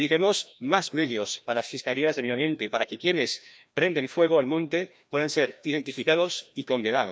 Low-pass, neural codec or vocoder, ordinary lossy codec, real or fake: none; codec, 16 kHz, 1 kbps, FreqCodec, larger model; none; fake